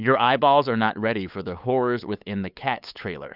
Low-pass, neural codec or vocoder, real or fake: 5.4 kHz; codec, 16 kHz, 8 kbps, FunCodec, trained on LibriTTS, 25 frames a second; fake